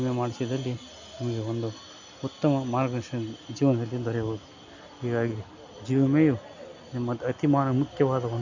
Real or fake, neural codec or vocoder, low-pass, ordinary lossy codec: real; none; 7.2 kHz; MP3, 64 kbps